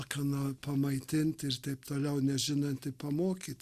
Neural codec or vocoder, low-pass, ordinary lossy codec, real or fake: none; 14.4 kHz; Opus, 64 kbps; real